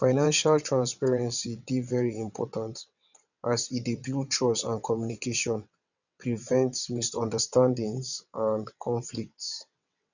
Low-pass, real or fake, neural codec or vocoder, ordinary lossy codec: 7.2 kHz; fake; vocoder, 22.05 kHz, 80 mel bands, WaveNeXt; none